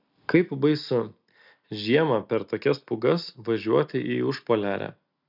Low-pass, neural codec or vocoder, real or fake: 5.4 kHz; none; real